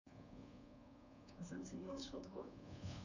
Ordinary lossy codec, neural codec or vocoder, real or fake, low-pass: none; codec, 24 kHz, 1.2 kbps, DualCodec; fake; 7.2 kHz